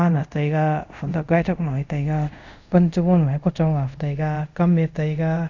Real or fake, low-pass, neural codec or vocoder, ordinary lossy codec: fake; 7.2 kHz; codec, 24 kHz, 0.5 kbps, DualCodec; none